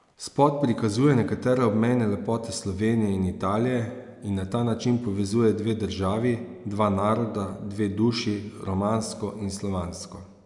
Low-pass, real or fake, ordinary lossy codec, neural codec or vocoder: 10.8 kHz; real; MP3, 96 kbps; none